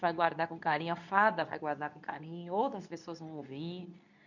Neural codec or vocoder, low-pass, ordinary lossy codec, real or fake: codec, 24 kHz, 0.9 kbps, WavTokenizer, medium speech release version 2; 7.2 kHz; none; fake